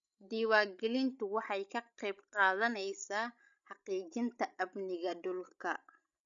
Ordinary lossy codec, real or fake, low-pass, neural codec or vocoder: none; fake; 7.2 kHz; codec, 16 kHz, 16 kbps, FreqCodec, larger model